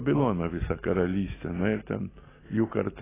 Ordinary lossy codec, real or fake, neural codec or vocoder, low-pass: AAC, 16 kbps; real; none; 3.6 kHz